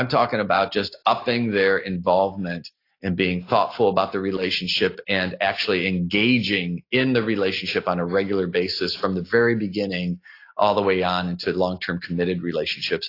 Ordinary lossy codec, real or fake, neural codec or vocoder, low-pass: AAC, 32 kbps; real; none; 5.4 kHz